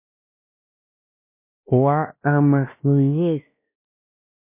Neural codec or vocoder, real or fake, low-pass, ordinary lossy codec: codec, 16 kHz, 1 kbps, X-Codec, WavLM features, trained on Multilingual LibriSpeech; fake; 3.6 kHz; MP3, 24 kbps